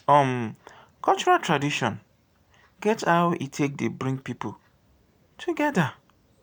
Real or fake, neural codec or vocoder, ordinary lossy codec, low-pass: real; none; none; none